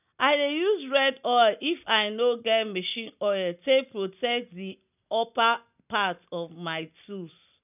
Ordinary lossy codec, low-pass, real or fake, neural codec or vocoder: none; 3.6 kHz; real; none